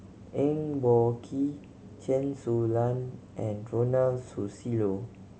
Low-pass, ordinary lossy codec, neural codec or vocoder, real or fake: none; none; none; real